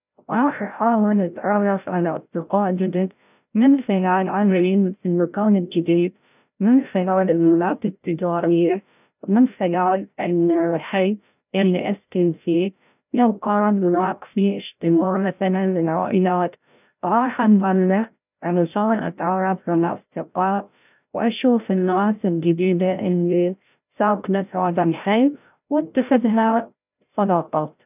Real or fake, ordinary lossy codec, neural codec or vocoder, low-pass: fake; none; codec, 16 kHz, 0.5 kbps, FreqCodec, larger model; 3.6 kHz